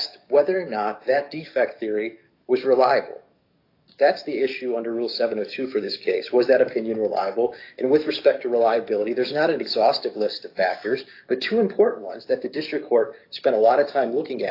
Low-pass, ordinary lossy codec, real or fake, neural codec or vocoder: 5.4 kHz; AAC, 32 kbps; fake; codec, 44.1 kHz, 7.8 kbps, DAC